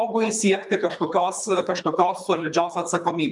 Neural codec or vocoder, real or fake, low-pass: codec, 24 kHz, 3 kbps, HILCodec; fake; 10.8 kHz